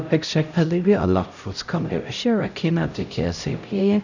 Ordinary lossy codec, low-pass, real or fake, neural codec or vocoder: none; 7.2 kHz; fake; codec, 16 kHz, 0.5 kbps, X-Codec, HuBERT features, trained on LibriSpeech